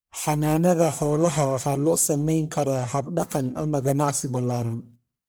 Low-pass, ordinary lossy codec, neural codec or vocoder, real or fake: none; none; codec, 44.1 kHz, 1.7 kbps, Pupu-Codec; fake